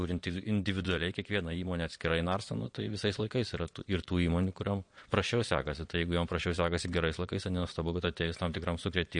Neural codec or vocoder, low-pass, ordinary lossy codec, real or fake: vocoder, 22.05 kHz, 80 mel bands, Vocos; 9.9 kHz; MP3, 48 kbps; fake